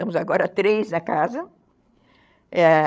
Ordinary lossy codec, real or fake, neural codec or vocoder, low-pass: none; fake; codec, 16 kHz, 16 kbps, FreqCodec, larger model; none